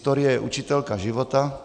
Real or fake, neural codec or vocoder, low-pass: real; none; 9.9 kHz